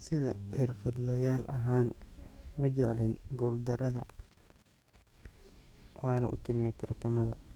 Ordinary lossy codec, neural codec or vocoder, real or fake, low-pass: none; codec, 44.1 kHz, 2.6 kbps, DAC; fake; 19.8 kHz